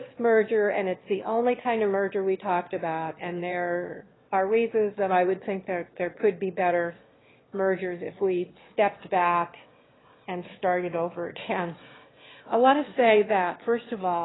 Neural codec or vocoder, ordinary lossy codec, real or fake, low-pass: autoencoder, 22.05 kHz, a latent of 192 numbers a frame, VITS, trained on one speaker; AAC, 16 kbps; fake; 7.2 kHz